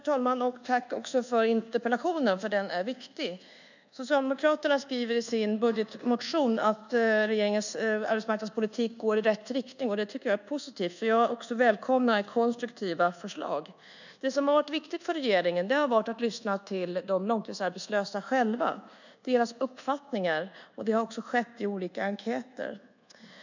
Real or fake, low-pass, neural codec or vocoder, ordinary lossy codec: fake; 7.2 kHz; codec, 24 kHz, 1.2 kbps, DualCodec; none